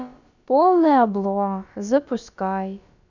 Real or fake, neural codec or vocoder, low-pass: fake; codec, 16 kHz, about 1 kbps, DyCAST, with the encoder's durations; 7.2 kHz